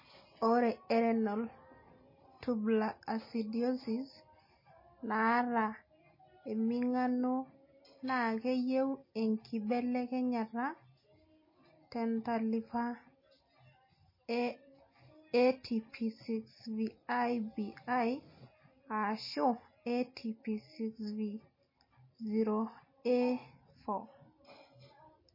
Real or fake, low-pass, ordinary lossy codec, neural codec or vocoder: real; 5.4 kHz; MP3, 24 kbps; none